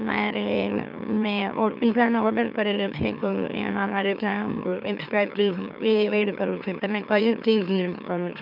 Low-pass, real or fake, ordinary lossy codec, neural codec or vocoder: 5.4 kHz; fake; none; autoencoder, 44.1 kHz, a latent of 192 numbers a frame, MeloTTS